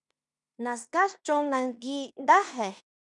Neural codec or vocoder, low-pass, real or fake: codec, 16 kHz in and 24 kHz out, 0.9 kbps, LongCat-Audio-Codec, fine tuned four codebook decoder; 10.8 kHz; fake